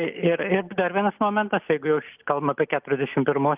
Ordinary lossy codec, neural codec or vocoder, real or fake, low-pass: Opus, 32 kbps; none; real; 3.6 kHz